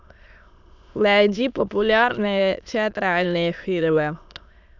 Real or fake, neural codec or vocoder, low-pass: fake; autoencoder, 22.05 kHz, a latent of 192 numbers a frame, VITS, trained on many speakers; 7.2 kHz